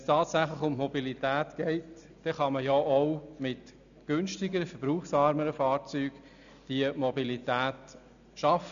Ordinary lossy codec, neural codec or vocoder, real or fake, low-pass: none; none; real; 7.2 kHz